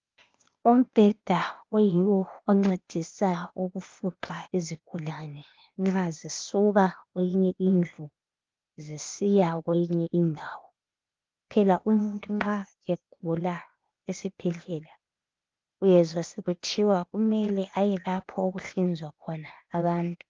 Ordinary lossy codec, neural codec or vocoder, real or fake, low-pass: Opus, 32 kbps; codec, 16 kHz, 0.8 kbps, ZipCodec; fake; 7.2 kHz